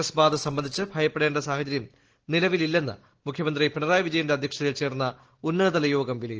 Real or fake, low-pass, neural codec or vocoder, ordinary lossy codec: real; 7.2 kHz; none; Opus, 16 kbps